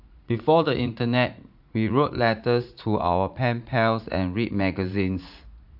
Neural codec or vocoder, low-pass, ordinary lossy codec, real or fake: vocoder, 44.1 kHz, 80 mel bands, Vocos; 5.4 kHz; AAC, 48 kbps; fake